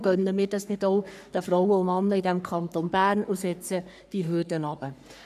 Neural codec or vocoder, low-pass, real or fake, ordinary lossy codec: codec, 44.1 kHz, 3.4 kbps, Pupu-Codec; 14.4 kHz; fake; none